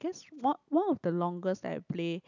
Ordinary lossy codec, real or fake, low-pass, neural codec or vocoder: none; real; 7.2 kHz; none